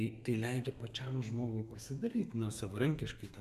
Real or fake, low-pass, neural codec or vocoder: fake; 14.4 kHz; codec, 32 kHz, 1.9 kbps, SNAC